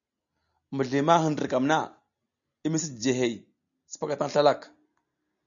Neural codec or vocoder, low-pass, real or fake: none; 7.2 kHz; real